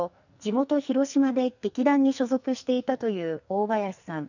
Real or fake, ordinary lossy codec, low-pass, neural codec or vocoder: fake; none; 7.2 kHz; codec, 44.1 kHz, 2.6 kbps, SNAC